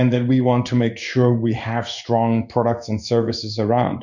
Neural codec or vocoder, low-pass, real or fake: codec, 16 kHz in and 24 kHz out, 1 kbps, XY-Tokenizer; 7.2 kHz; fake